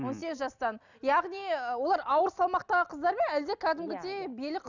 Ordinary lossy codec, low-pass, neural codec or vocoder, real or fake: none; 7.2 kHz; none; real